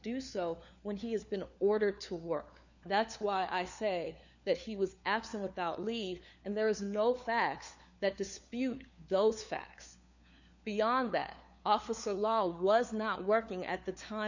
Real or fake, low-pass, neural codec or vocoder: fake; 7.2 kHz; codec, 16 kHz, 4 kbps, FunCodec, trained on LibriTTS, 50 frames a second